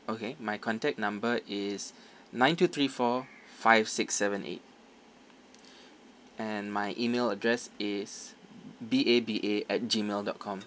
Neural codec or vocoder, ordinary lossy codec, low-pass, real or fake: none; none; none; real